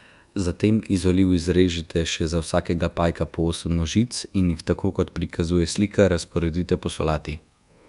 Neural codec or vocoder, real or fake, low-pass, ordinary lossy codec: codec, 24 kHz, 1.2 kbps, DualCodec; fake; 10.8 kHz; none